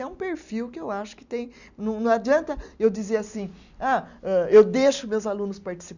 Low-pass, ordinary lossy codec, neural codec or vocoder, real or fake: 7.2 kHz; none; none; real